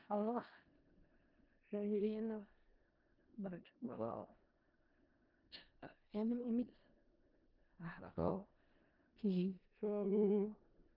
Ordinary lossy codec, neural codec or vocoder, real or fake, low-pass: Opus, 32 kbps; codec, 16 kHz in and 24 kHz out, 0.4 kbps, LongCat-Audio-Codec, four codebook decoder; fake; 5.4 kHz